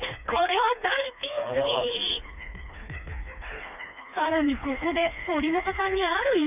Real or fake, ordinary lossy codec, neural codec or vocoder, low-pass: fake; none; codec, 16 kHz, 2 kbps, FreqCodec, smaller model; 3.6 kHz